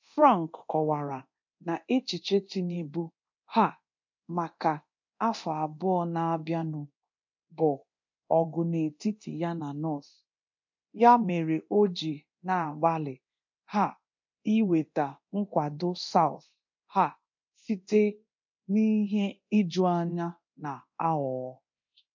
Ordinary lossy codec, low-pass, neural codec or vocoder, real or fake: MP3, 48 kbps; 7.2 kHz; codec, 24 kHz, 0.9 kbps, DualCodec; fake